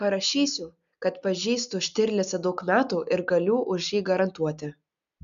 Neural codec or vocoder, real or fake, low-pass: none; real; 7.2 kHz